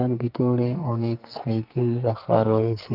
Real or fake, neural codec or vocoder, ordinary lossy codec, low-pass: fake; codec, 44.1 kHz, 2.6 kbps, SNAC; Opus, 32 kbps; 5.4 kHz